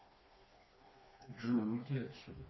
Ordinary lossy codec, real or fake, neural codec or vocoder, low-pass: MP3, 24 kbps; fake; codec, 16 kHz, 2 kbps, FreqCodec, smaller model; 7.2 kHz